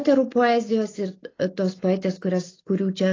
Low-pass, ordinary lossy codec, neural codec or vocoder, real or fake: 7.2 kHz; AAC, 32 kbps; none; real